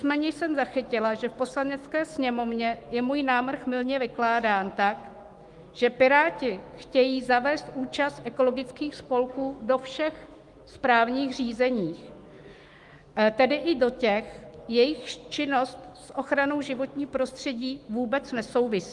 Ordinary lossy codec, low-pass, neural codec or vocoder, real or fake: Opus, 24 kbps; 10.8 kHz; autoencoder, 48 kHz, 128 numbers a frame, DAC-VAE, trained on Japanese speech; fake